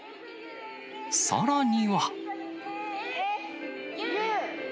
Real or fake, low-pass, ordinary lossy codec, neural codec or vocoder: real; none; none; none